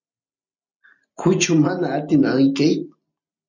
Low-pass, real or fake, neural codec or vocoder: 7.2 kHz; real; none